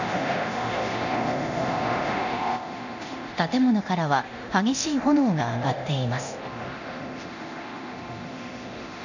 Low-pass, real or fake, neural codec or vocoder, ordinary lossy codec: 7.2 kHz; fake; codec, 24 kHz, 0.9 kbps, DualCodec; none